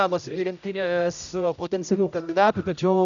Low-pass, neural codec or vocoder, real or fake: 7.2 kHz; codec, 16 kHz, 0.5 kbps, X-Codec, HuBERT features, trained on general audio; fake